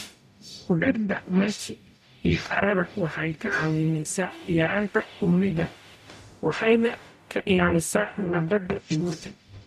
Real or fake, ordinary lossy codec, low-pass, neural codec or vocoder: fake; none; 14.4 kHz; codec, 44.1 kHz, 0.9 kbps, DAC